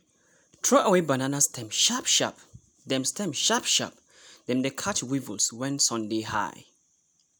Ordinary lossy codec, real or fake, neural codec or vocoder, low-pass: none; real; none; none